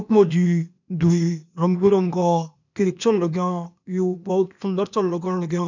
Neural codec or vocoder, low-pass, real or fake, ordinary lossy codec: codec, 16 kHz, 0.8 kbps, ZipCodec; 7.2 kHz; fake; none